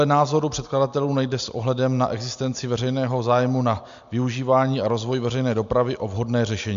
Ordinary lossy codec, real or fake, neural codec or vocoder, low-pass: MP3, 64 kbps; real; none; 7.2 kHz